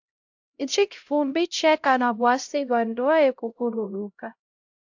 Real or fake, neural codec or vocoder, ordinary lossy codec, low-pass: fake; codec, 16 kHz, 0.5 kbps, X-Codec, HuBERT features, trained on LibriSpeech; AAC, 48 kbps; 7.2 kHz